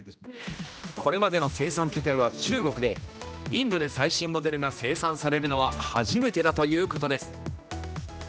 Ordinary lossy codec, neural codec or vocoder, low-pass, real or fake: none; codec, 16 kHz, 1 kbps, X-Codec, HuBERT features, trained on general audio; none; fake